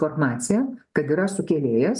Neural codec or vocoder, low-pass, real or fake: none; 10.8 kHz; real